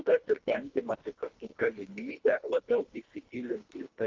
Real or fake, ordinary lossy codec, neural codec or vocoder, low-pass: fake; Opus, 32 kbps; codec, 24 kHz, 1.5 kbps, HILCodec; 7.2 kHz